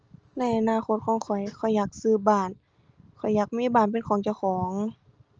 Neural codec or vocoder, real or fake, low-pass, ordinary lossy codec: none; real; 7.2 kHz; Opus, 24 kbps